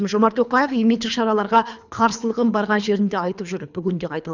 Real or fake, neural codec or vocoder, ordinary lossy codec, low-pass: fake; codec, 24 kHz, 3 kbps, HILCodec; none; 7.2 kHz